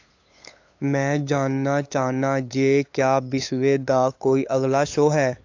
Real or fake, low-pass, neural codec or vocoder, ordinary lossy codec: fake; 7.2 kHz; codec, 16 kHz, 8 kbps, FunCodec, trained on LibriTTS, 25 frames a second; AAC, 48 kbps